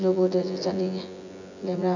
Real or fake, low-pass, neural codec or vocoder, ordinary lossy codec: fake; 7.2 kHz; vocoder, 24 kHz, 100 mel bands, Vocos; none